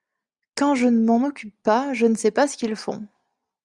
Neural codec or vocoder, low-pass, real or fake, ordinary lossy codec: none; 10.8 kHz; real; Opus, 64 kbps